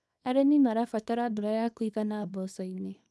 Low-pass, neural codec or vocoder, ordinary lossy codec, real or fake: none; codec, 24 kHz, 0.9 kbps, WavTokenizer, small release; none; fake